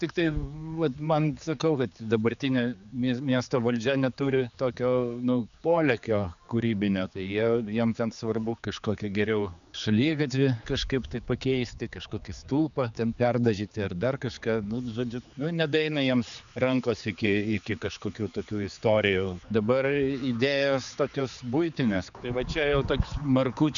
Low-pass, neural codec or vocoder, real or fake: 7.2 kHz; codec, 16 kHz, 4 kbps, X-Codec, HuBERT features, trained on general audio; fake